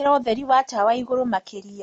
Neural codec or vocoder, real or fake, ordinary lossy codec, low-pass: none; real; MP3, 48 kbps; 9.9 kHz